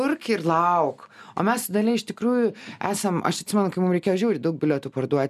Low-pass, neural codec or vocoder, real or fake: 14.4 kHz; none; real